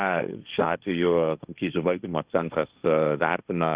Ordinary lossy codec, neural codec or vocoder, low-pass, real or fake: Opus, 64 kbps; codec, 16 kHz, 1.1 kbps, Voila-Tokenizer; 3.6 kHz; fake